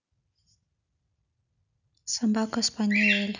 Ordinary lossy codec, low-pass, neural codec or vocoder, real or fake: none; 7.2 kHz; none; real